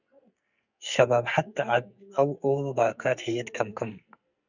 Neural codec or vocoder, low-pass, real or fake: codec, 44.1 kHz, 2.6 kbps, SNAC; 7.2 kHz; fake